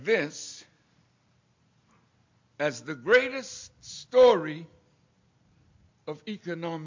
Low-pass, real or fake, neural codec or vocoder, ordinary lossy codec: 7.2 kHz; real; none; MP3, 48 kbps